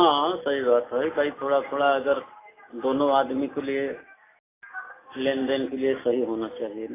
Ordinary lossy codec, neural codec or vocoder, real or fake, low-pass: AAC, 16 kbps; none; real; 3.6 kHz